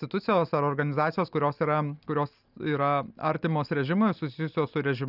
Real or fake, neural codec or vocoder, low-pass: real; none; 5.4 kHz